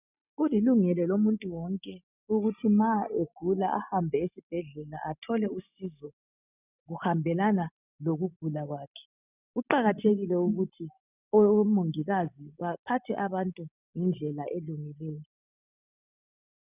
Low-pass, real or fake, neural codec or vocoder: 3.6 kHz; real; none